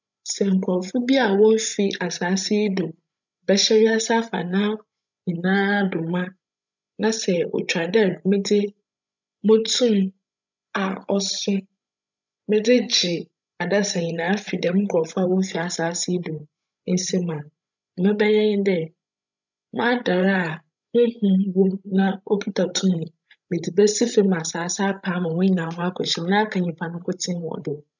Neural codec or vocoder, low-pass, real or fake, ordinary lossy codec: codec, 16 kHz, 16 kbps, FreqCodec, larger model; 7.2 kHz; fake; none